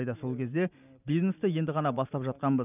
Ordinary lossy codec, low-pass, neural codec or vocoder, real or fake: none; 3.6 kHz; none; real